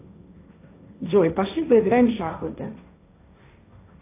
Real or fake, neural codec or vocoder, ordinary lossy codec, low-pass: fake; codec, 16 kHz, 1.1 kbps, Voila-Tokenizer; AAC, 24 kbps; 3.6 kHz